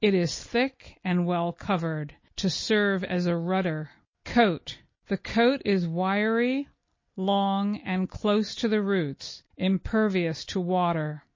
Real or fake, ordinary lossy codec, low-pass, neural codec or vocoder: real; MP3, 32 kbps; 7.2 kHz; none